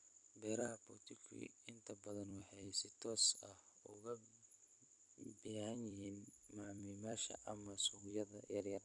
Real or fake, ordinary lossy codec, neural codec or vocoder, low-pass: fake; none; vocoder, 44.1 kHz, 128 mel bands every 256 samples, BigVGAN v2; 10.8 kHz